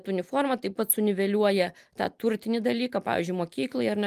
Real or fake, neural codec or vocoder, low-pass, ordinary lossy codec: real; none; 14.4 kHz; Opus, 32 kbps